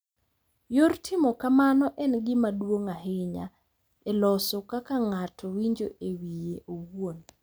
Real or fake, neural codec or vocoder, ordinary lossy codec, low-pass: real; none; none; none